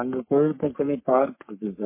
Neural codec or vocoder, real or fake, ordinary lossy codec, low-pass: codec, 44.1 kHz, 3.4 kbps, Pupu-Codec; fake; MP3, 24 kbps; 3.6 kHz